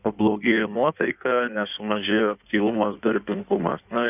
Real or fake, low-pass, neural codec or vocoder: fake; 3.6 kHz; codec, 16 kHz in and 24 kHz out, 1.1 kbps, FireRedTTS-2 codec